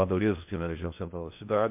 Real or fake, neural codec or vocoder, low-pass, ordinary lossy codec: fake; codec, 16 kHz in and 24 kHz out, 0.8 kbps, FocalCodec, streaming, 65536 codes; 3.6 kHz; MP3, 32 kbps